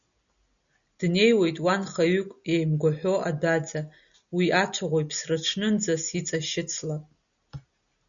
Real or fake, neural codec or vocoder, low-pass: real; none; 7.2 kHz